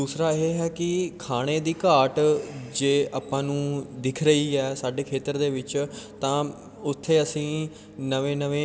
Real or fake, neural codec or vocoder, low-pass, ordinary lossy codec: real; none; none; none